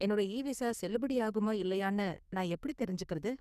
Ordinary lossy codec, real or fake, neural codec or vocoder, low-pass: none; fake; codec, 44.1 kHz, 2.6 kbps, SNAC; 14.4 kHz